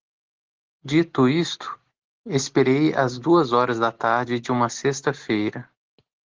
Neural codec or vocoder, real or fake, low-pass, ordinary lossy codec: none; real; 7.2 kHz; Opus, 16 kbps